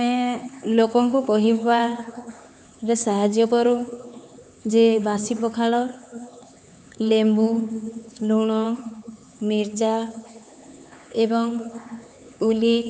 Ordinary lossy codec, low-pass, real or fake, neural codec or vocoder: none; none; fake; codec, 16 kHz, 4 kbps, X-Codec, HuBERT features, trained on LibriSpeech